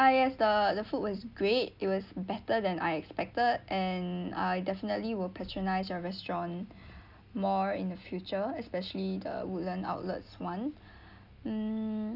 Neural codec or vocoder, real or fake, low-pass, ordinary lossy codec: none; real; 5.4 kHz; none